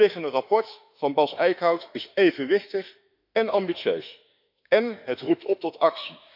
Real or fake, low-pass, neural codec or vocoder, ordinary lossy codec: fake; 5.4 kHz; autoencoder, 48 kHz, 32 numbers a frame, DAC-VAE, trained on Japanese speech; none